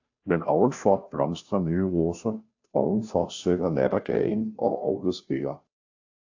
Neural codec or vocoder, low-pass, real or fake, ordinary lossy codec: codec, 16 kHz, 0.5 kbps, FunCodec, trained on Chinese and English, 25 frames a second; 7.2 kHz; fake; AAC, 48 kbps